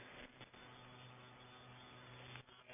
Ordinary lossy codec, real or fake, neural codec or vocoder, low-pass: none; real; none; 3.6 kHz